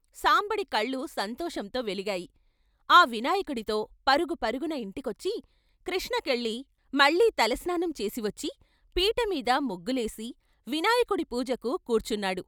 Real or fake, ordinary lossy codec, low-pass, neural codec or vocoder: real; none; none; none